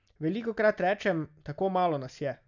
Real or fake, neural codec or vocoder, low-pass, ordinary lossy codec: real; none; 7.2 kHz; none